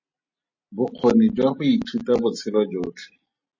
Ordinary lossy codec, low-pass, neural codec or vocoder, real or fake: MP3, 32 kbps; 7.2 kHz; none; real